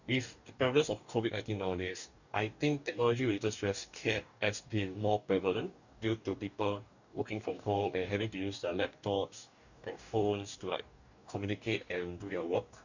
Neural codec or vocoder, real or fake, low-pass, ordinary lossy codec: codec, 44.1 kHz, 2.6 kbps, DAC; fake; 7.2 kHz; none